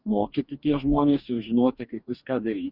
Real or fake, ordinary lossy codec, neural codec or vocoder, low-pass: fake; Opus, 64 kbps; codec, 44.1 kHz, 2.6 kbps, DAC; 5.4 kHz